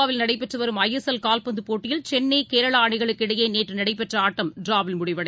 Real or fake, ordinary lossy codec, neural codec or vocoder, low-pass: real; none; none; none